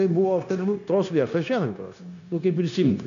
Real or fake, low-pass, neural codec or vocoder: fake; 7.2 kHz; codec, 16 kHz, 0.9 kbps, LongCat-Audio-Codec